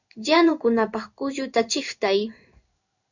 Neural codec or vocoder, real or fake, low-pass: codec, 16 kHz in and 24 kHz out, 1 kbps, XY-Tokenizer; fake; 7.2 kHz